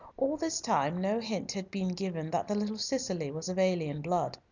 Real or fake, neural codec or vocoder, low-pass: real; none; 7.2 kHz